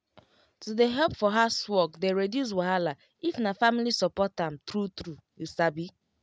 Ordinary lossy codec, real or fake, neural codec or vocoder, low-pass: none; real; none; none